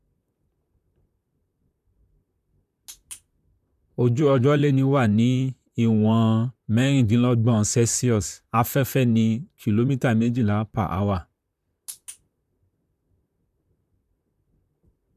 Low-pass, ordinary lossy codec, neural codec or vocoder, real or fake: 14.4 kHz; MP3, 96 kbps; vocoder, 44.1 kHz, 128 mel bands, Pupu-Vocoder; fake